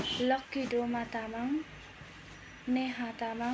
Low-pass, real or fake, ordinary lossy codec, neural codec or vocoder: none; real; none; none